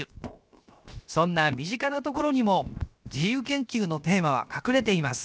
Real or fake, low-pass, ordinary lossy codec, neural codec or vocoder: fake; none; none; codec, 16 kHz, 0.7 kbps, FocalCodec